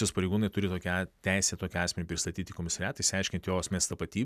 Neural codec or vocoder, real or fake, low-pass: none; real; 14.4 kHz